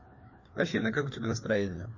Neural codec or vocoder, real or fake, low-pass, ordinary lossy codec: codec, 16 kHz, 2 kbps, FreqCodec, larger model; fake; 7.2 kHz; MP3, 32 kbps